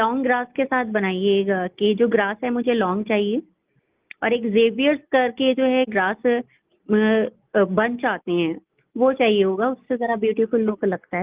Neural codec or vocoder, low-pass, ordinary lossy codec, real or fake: none; 3.6 kHz; Opus, 32 kbps; real